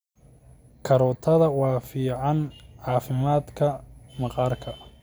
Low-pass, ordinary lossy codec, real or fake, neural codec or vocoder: none; none; real; none